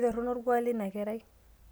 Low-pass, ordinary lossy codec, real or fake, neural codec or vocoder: none; none; real; none